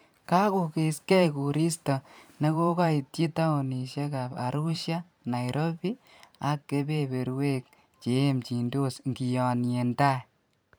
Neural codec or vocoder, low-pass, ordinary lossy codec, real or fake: vocoder, 44.1 kHz, 128 mel bands every 512 samples, BigVGAN v2; none; none; fake